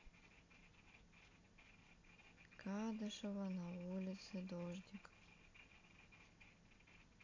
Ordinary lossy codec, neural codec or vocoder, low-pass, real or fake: AAC, 32 kbps; none; 7.2 kHz; real